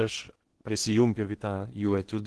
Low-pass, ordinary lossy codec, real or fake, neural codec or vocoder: 10.8 kHz; Opus, 16 kbps; fake; codec, 16 kHz in and 24 kHz out, 0.8 kbps, FocalCodec, streaming, 65536 codes